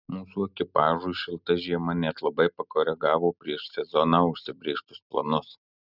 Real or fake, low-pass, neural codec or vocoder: real; 5.4 kHz; none